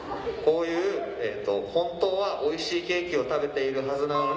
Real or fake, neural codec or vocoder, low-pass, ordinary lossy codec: real; none; none; none